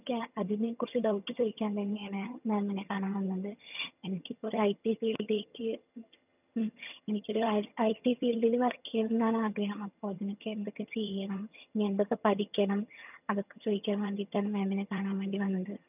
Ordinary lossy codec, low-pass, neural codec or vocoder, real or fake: none; 3.6 kHz; vocoder, 22.05 kHz, 80 mel bands, HiFi-GAN; fake